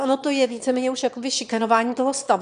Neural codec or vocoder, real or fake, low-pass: autoencoder, 22.05 kHz, a latent of 192 numbers a frame, VITS, trained on one speaker; fake; 9.9 kHz